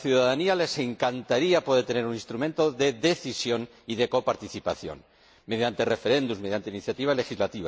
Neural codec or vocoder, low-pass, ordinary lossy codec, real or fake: none; none; none; real